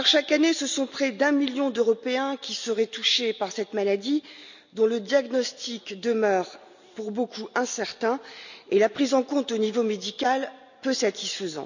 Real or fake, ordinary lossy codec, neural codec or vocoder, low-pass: real; none; none; 7.2 kHz